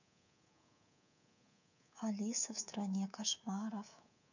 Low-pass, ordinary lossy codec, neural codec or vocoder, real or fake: 7.2 kHz; none; codec, 24 kHz, 3.1 kbps, DualCodec; fake